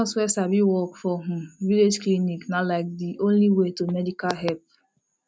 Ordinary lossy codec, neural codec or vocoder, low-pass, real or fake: none; none; none; real